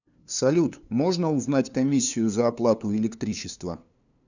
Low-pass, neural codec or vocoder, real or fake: 7.2 kHz; codec, 16 kHz, 2 kbps, FunCodec, trained on LibriTTS, 25 frames a second; fake